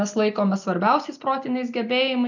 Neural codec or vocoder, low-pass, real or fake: none; 7.2 kHz; real